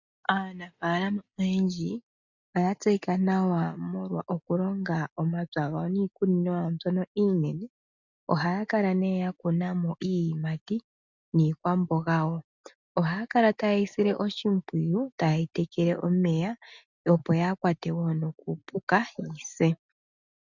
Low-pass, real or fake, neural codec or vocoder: 7.2 kHz; real; none